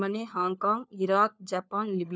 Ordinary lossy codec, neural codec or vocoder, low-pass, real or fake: none; codec, 16 kHz, 4 kbps, FunCodec, trained on LibriTTS, 50 frames a second; none; fake